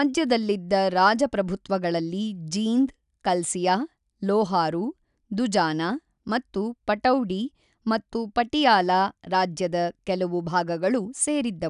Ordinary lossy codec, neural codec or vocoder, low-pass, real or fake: none; none; 10.8 kHz; real